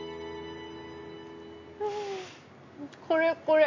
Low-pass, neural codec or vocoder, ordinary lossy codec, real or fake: 7.2 kHz; none; AAC, 48 kbps; real